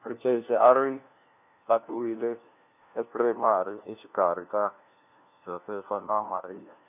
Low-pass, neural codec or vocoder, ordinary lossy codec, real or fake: 3.6 kHz; codec, 16 kHz, 1 kbps, FunCodec, trained on LibriTTS, 50 frames a second; none; fake